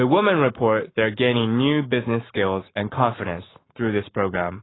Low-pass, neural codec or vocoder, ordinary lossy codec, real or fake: 7.2 kHz; codec, 44.1 kHz, 7.8 kbps, Pupu-Codec; AAC, 16 kbps; fake